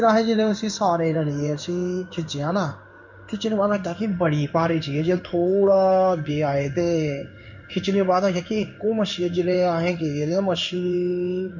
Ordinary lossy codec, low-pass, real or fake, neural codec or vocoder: none; 7.2 kHz; fake; codec, 16 kHz in and 24 kHz out, 1 kbps, XY-Tokenizer